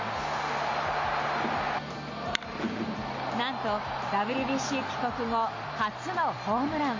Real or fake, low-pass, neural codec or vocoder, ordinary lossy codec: real; 7.2 kHz; none; MP3, 48 kbps